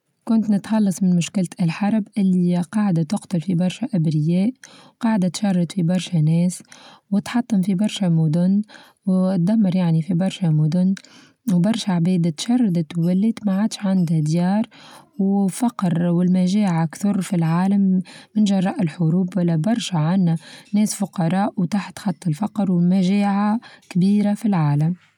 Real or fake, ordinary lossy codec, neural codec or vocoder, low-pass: real; none; none; 19.8 kHz